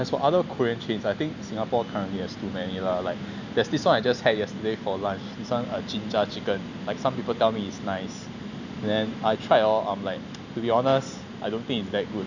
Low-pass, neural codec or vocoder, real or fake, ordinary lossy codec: 7.2 kHz; none; real; none